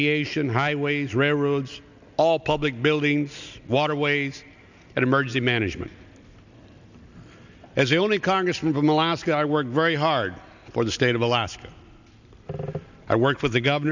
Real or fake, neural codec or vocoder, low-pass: real; none; 7.2 kHz